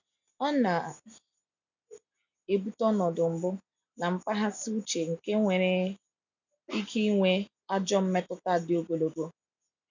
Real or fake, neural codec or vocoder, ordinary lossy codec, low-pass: real; none; none; 7.2 kHz